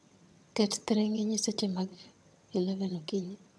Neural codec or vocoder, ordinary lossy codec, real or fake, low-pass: vocoder, 22.05 kHz, 80 mel bands, HiFi-GAN; none; fake; none